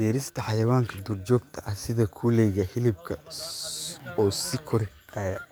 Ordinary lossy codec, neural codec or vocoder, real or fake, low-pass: none; codec, 44.1 kHz, 7.8 kbps, DAC; fake; none